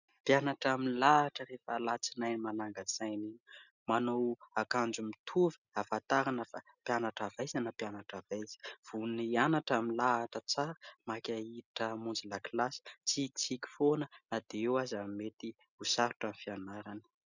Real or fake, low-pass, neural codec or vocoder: real; 7.2 kHz; none